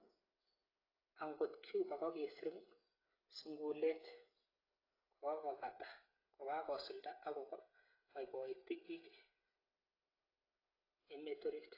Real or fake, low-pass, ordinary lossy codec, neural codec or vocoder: fake; 5.4 kHz; AAC, 24 kbps; codec, 16 kHz, 8 kbps, FreqCodec, smaller model